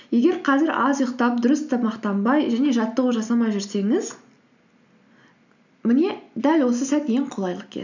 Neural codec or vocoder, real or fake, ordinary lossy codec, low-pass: none; real; none; 7.2 kHz